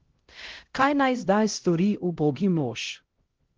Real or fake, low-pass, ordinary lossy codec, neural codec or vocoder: fake; 7.2 kHz; Opus, 16 kbps; codec, 16 kHz, 0.5 kbps, X-Codec, HuBERT features, trained on LibriSpeech